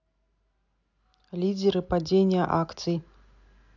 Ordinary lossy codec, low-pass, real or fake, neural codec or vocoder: none; 7.2 kHz; real; none